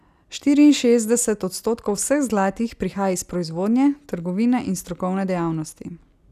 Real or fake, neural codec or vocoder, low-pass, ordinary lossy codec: real; none; 14.4 kHz; none